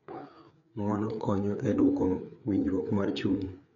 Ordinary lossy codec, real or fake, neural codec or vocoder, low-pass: none; fake; codec, 16 kHz, 4 kbps, FreqCodec, larger model; 7.2 kHz